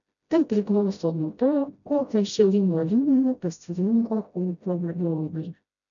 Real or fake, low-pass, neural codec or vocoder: fake; 7.2 kHz; codec, 16 kHz, 0.5 kbps, FreqCodec, smaller model